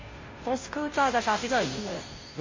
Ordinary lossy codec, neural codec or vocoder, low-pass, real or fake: MP3, 32 kbps; codec, 16 kHz, 0.5 kbps, FunCodec, trained on Chinese and English, 25 frames a second; 7.2 kHz; fake